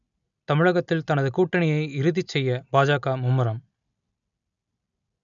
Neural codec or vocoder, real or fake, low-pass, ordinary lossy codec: none; real; 7.2 kHz; none